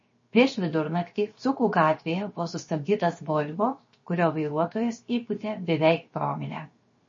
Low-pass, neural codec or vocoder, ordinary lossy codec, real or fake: 7.2 kHz; codec, 16 kHz, 0.7 kbps, FocalCodec; MP3, 32 kbps; fake